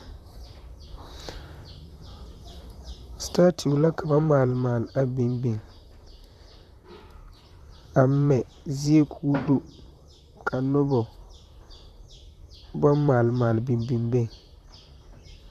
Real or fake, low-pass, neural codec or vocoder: fake; 14.4 kHz; vocoder, 44.1 kHz, 128 mel bands, Pupu-Vocoder